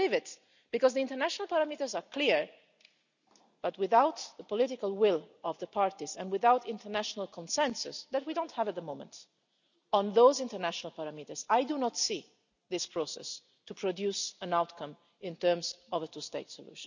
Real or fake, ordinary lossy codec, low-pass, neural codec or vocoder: real; none; 7.2 kHz; none